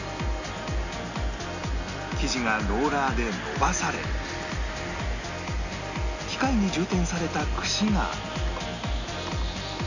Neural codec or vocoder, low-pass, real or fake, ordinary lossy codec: none; 7.2 kHz; real; none